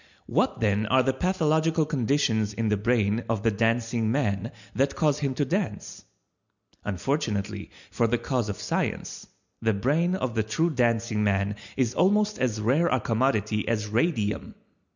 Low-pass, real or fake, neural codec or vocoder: 7.2 kHz; real; none